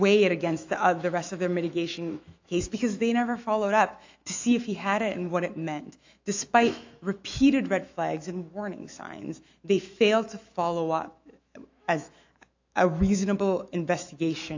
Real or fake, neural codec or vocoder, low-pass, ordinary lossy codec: fake; autoencoder, 48 kHz, 128 numbers a frame, DAC-VAE, trained on Japanese speech; 7.2 kHz; AAC, 48 kbps